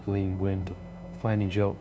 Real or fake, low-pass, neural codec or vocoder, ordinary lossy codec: fake; none; codec, 16 kHz, 0.5 kbps, FunCodec, trained on LibriTTS, 25 frames a second; none